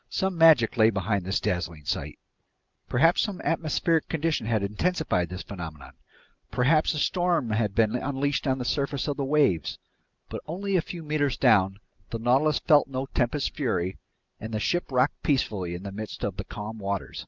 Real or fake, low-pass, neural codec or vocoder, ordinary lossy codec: real; 7.2 kHz; none; Opus, 32 kbps